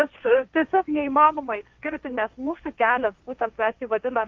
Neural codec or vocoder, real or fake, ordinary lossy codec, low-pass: codec, 16 kHz, 1.1 kbps, Voila-Tokenizer; fake; Opus, 24 kbps; 7.2 kHz